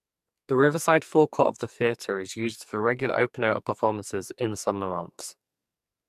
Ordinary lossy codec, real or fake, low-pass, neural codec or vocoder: MP3, 96 kbps; fake; 14.4 kHz; codec, 44.1 kHz, 2.6 kbps, SNAC